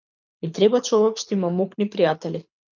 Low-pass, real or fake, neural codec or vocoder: 7.2 kHz; fake; codec, 24 kHz, 6 kbps, HILCodec